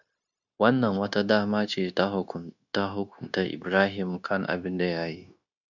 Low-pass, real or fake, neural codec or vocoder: 7.2 kHz; fake; codec, 16 kHz, 0.9 kbps, LongCat-Audio-Codec